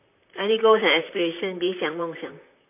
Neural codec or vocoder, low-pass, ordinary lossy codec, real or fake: vocoder, 44.1 kHz, 128 mel bands, Pupu-Vocoder; 3.6 kHz; MP3, 24 kbps; fake